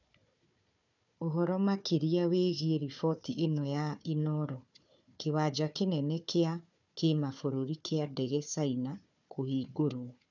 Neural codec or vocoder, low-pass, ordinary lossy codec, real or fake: codec, 16 kHz, 4 kbps, FunCodec, trained on Chinese and English, 50 frames a second; 7.2 kHz; none; fake